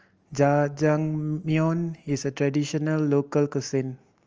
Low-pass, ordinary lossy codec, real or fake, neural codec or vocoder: 7.2 kHz; Opus, 24 kbps; real; none